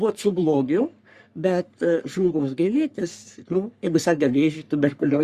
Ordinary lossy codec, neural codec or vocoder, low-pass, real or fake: Opus, 64 kbps; codec, 44.1 kHz, 3.4 kbps, Pupu-Codec; 14.4 kHz; fake